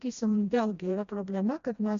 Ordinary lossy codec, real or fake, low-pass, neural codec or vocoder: MP3, 64 kbps; fake; 7.2 kHz; codec, 16 kHz, 1 kbps, FreqCodec, smaller model